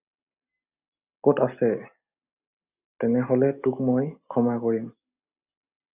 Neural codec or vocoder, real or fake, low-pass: none; real; 3.6 kHz